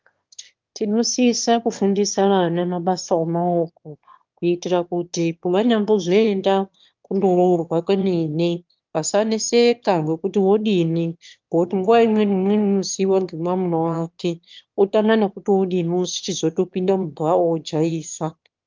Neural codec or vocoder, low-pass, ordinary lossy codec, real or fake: autoencoder, 22.05 kHz, a latent of 192 numbers a frame, VITS, trained on one speaker; 7.2 kHz; Opus, 32 kbps; fake